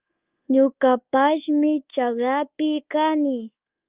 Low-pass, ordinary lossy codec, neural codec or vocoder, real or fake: 3.6 kHz; Opus, 24 kbps; codec, 24 kHz, 1.2 kbps, DualCodec; fake